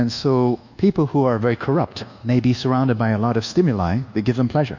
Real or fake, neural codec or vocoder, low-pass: fake; codec, 24 kHz, 1.2 kbps, DualCodec; 7.2 kHz